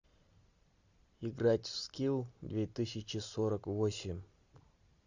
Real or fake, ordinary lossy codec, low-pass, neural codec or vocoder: real; Opus, 64 kbps; 7.2 kHz; none